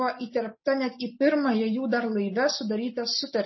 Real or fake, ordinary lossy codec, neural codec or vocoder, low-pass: real; MP3, 24 kbps; none; 7.2 kHz